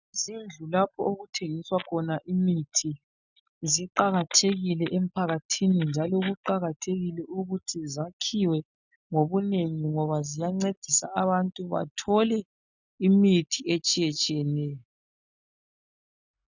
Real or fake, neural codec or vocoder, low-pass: real; none; 7.2 kHz